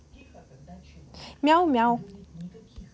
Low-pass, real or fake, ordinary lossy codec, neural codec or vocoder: none; real; none; none